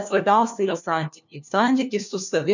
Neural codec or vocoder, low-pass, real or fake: codec, 16 kHz, 1 kbps, FunCodec, trained on LibriTTS, 50 frames a second; 7.2 kHz; fake